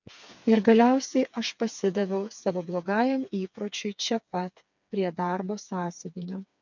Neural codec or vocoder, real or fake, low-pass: codec, 16 kHz, 4 kbps, FreqCodec, smaller model; fake; 7.2 kHz